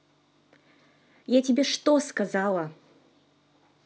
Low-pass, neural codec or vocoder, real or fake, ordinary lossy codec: none; none; real; none